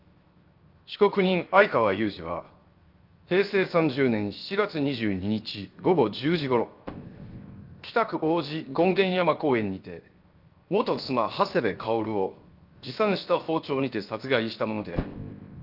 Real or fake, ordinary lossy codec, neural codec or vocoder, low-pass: fake; Opus, 24 kbps; codec, 16 kHz, 0.7 kbps, FocalCodec; 5.4 kHz